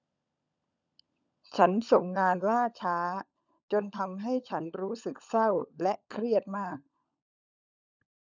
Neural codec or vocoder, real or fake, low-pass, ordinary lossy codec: codec, 16 kHz, 16 kbps, FunCodec, trained on LibriTTS, 50 frames a second; fake; 7.2 kHz; none